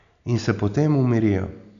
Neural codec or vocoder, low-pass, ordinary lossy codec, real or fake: none; 7.2 kHz; none; real